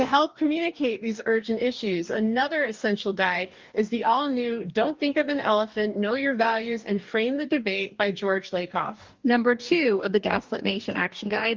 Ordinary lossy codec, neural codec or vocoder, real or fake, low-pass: Opus, 32 kbps; codec, 44.1 kHz, 2.6 kbps, DAC; fake; 7.2 kHz